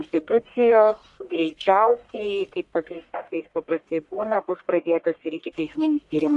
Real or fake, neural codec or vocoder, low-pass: fake; codec, 44.1 kHz, 1.7 kbps, Pupu-Codec; 10.8 kHz